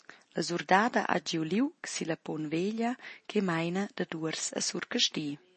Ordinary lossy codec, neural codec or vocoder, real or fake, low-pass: MP3, 32 kbps; none; real; 10.8 kHz